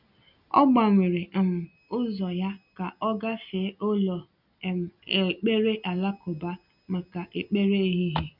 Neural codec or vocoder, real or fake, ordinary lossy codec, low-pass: none; real; none; 5.4 kHz